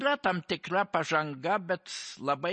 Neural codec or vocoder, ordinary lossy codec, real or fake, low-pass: none; MP3, 32 kbps; real; 10.8 kHz